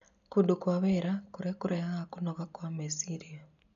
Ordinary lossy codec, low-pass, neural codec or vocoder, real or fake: none; 7.2 kHz; none; real